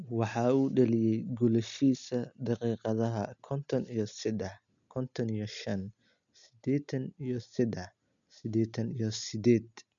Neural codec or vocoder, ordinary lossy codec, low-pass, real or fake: none; none; 7.2 kHz; real